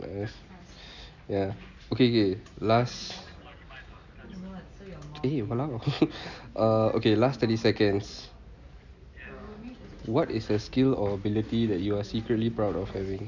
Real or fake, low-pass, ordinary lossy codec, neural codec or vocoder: real; 7.2 kHz; none; none